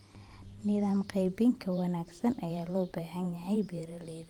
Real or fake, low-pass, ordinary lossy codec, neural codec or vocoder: fake; 19.8 kHz; Opus, 32 kbps; vocoder, 44.1 kHz, 128 mel bands every 512 samples, BigVGAN v2